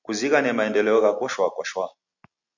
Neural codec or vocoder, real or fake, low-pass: none; real; 7.2 kHz